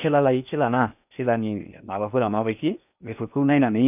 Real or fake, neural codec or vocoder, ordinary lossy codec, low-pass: fake; codec, 16 kHz in and 24 kHz out, 0.8 kbps, FocalCodec, streaming, 65536 codes; none; 3.6 kHz